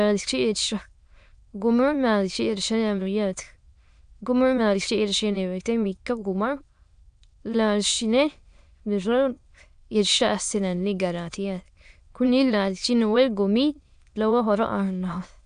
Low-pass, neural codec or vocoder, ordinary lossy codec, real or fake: 9.9 kHz; autoencoder, 22.05 kHz, a latent of 192 numbers a frame, VITS, trained on many speakers; AAC, 96 kbps; fake